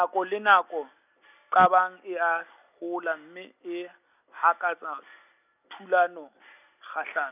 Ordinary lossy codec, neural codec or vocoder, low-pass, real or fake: none; none; 3.6 kHz; real